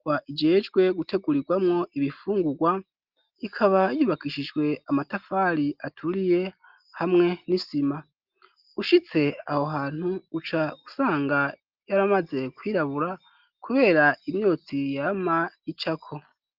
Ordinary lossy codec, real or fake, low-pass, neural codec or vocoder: Opus, 32 kbps; real; 5.4 kHz; none